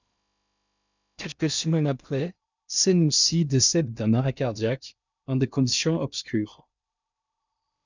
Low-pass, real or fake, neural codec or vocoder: 7.2 kHz; fake; codec, 16 kHz in and 24 kHz out, 0.6 kbps, FocalCodec, streaming, 2048 codes